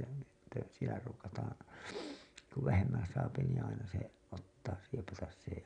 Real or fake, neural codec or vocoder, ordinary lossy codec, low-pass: real; none; none; 9.9 kHz